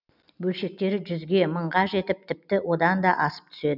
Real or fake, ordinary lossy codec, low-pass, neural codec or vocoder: real; none; 5.4 kHz; none